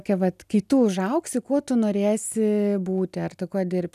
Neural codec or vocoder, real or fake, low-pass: none; real; 14.4 kHz